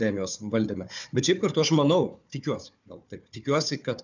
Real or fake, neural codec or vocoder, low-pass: fake; codec, 16 kHz, 4 kbps, FunCodec, trained on Chinese and English, 50 frames a second; 7.2 kHz